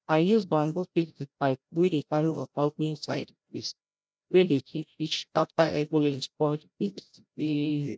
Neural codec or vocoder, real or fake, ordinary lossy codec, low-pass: codec, 16 kHz, 0.5 kbps, FreqCodec, larger model; fake; none; none